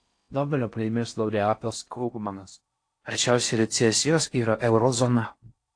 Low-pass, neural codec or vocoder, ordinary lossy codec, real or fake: 9.9 kHz; codec, 16 kHz in and 24 kHz out, 0.6 kbps, FocalCodec, streaming, 4096 codes; AAC, 48 kbps; fake